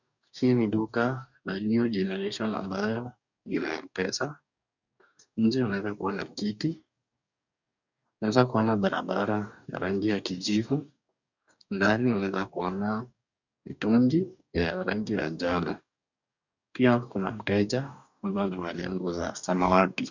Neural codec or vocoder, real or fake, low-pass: codec, 44.1 kHz, 2.6 kbps, DAC; fake; 7.2 kHz